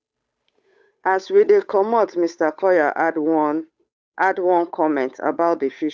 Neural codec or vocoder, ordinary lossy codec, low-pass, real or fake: codec, 16 kHz, 8 kbps, FunCodec, trained on Chinese and English, 25 frames a second; none; none; fake